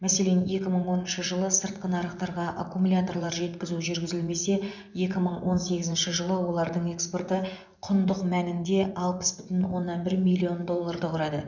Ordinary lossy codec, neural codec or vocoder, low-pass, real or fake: none; vocoder, 24 kHz, 100 mel bands, Vocos; 7.2 kHz; fake